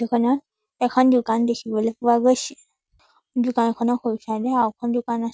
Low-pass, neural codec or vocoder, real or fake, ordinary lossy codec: none; none; real; none